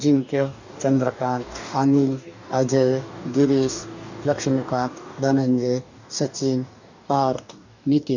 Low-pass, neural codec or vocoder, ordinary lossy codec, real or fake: 7.2 kHz; codec, 44.1 kHz, 2.6 kbps, DAC; none; fake